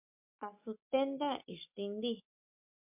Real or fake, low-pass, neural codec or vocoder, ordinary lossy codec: fake; 3.6 kHz; codec, 44.1 kHz, 7.8 kbps, Pupu-Codec; MP3, 32 kbps